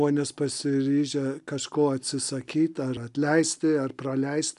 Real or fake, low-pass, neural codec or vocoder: real; 10.8 kHz; none